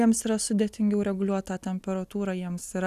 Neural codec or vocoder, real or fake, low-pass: none; real; 14.4 kHz